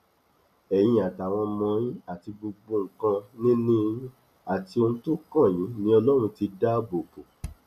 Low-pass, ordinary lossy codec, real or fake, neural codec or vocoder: 14.4 kHz; none; real; none